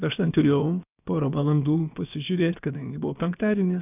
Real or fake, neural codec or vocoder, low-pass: fake; codec, 16 kHz, about 1 kbps, DyCAST, with the encoder's durations; 3.6 kHz